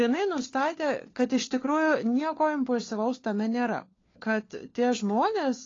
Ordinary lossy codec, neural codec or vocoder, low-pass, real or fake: AAC, 32 kbps; codec, 16 kHz, 4 kbps, FunCodec, trained on LibriTTS, 50 frames a second; 7.2 kHz; fake